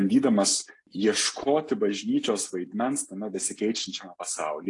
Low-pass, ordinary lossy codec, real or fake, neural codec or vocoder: 10.8 kHz; AAC, 48 kbps; real; none